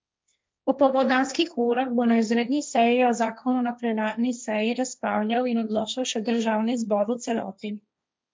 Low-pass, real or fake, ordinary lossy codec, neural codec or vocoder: none; fake; none; codec, 16 kHz, 1.1 kbps, Voila-Tokenizer